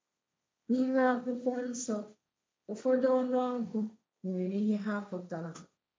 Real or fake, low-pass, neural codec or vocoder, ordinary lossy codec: fake; none; codec, 16 kHz, 1.1 kbps, Voila-Tokenizer; none